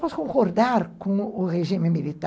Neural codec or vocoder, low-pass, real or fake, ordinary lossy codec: none; none; real; none